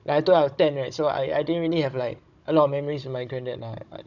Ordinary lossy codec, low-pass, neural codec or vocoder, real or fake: none; 7.2 kHz; codec, 16 kHz, 16 kbps, FunCodec, trained on Chinese and English, 50 frames a second; fake